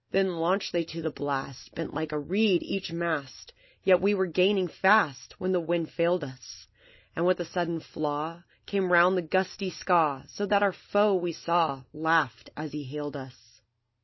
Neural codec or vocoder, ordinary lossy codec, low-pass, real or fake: none; MP3, 24 kbps; 7.2 kHz; real